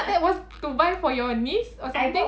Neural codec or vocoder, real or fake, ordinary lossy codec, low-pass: none; real; none; none